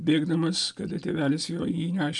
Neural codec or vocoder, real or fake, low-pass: none; real; 10.8 kHz